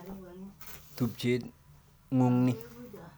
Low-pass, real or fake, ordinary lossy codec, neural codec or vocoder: none; real; none; none